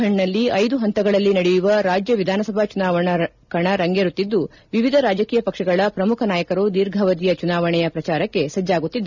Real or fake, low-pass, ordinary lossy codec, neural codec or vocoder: real; 7.2 kHz; none; none